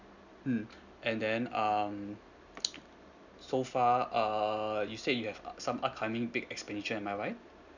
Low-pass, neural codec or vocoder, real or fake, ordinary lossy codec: 7.2 kHz; none; real; none